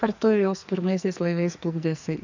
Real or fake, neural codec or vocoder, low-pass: fake; codec, 44.1 kHz, 2.6 kbps, SNAC; 7.2 kHz